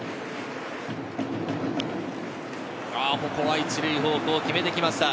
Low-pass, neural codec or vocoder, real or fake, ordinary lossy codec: none; none; real; none